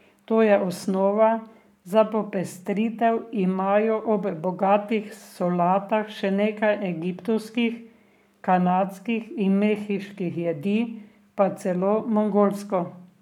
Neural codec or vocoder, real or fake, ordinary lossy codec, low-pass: codec, 44.1 kHz, 7.8 kbps, Pupu-Codec; fake; none; 19.8 kHz